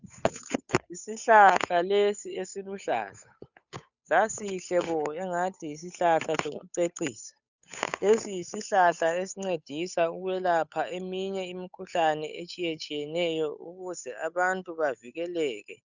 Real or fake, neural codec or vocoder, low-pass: fake; codec, 16 kHz, 8 kbps, FunCodec, trained on Chinese and English, 25 frames a second; 7.2 kHz